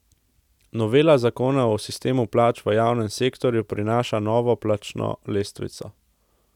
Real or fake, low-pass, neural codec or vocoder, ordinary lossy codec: real; 19.8 kHz; none; none